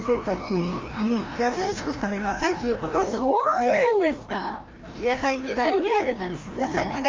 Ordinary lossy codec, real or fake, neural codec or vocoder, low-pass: Opus, 32 kbps; fake; codec, 16 kHz, 1 kbps, FreqCodec, larger model; 7.2 kHz